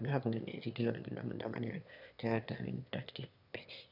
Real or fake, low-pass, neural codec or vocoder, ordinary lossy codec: fake; 5.4 kHz; autoencoder, 22.05 kHz, a latent of 192 numbers a frame, VITS, trained on one speaker; none